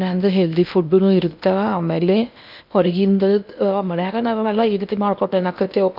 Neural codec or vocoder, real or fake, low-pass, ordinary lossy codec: codec, 16 kHz in and 24 kHz out, 0.8 kbps, FocalCodec, streaming, 65536 codes; fake; 5.4 kHz; none